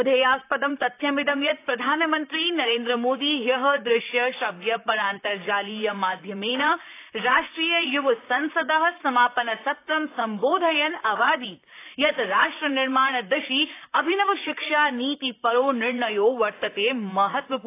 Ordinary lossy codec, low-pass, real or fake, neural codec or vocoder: AAC, 24 kbps; 3.6 kHz; fake; vocoder, 44.1 kHz, 128 mel bands, Pupu-Vocoder